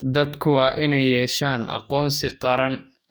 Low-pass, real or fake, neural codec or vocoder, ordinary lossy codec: none; fake; codec, 44.1 kHz, 2.6 kbps, DAC; none